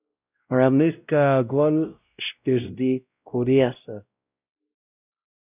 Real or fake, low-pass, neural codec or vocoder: fake; 3.6 kHz; codec, 16 kHz, 0.5 kbps, X-Codec, WavLM features, trained on Multilingual LibriSpeech